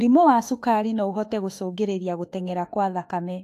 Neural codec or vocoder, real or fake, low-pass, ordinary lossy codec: autoencoder, 48 kHz, 32 numbers a frame, DAC-VAE, trained on Japanese speech; fake; 14.4 kHz; AAC, 64 kbps